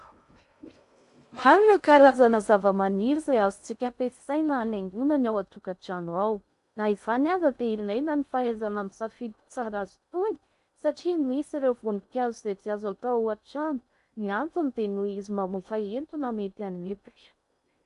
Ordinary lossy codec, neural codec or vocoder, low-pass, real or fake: AAC, 96 kbps; codec, 16 kHz in and 24 kHz out, 0.6 kbps, FocalCodec, streaming, 2048 codes; 10.8 kHz; fake